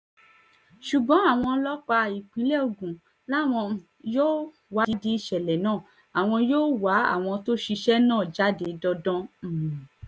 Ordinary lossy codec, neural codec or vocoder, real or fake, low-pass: none; none; real; none